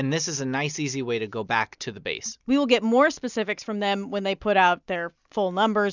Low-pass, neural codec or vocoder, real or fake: 7.2 kHz; none; real